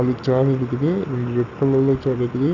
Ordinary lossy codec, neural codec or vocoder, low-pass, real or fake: none; none; 7.2 kHz; real